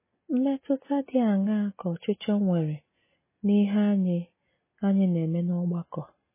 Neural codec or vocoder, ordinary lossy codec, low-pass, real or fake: none; MP3, 16 kbps; 3.6 kHz; real